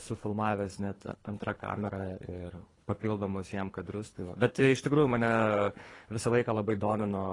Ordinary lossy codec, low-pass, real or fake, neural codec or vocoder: AAC, 32 kbps; 10.8 kHz; fake; codec, 24 kHz, 3 kbps, HILCodec